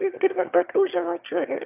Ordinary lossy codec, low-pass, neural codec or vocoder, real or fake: AAC, 32 kbps; 3.6 kHz; autoencoder, 22.05 kHz, a latent of 192 numbers a frame, VITS, trained on one speaker; fake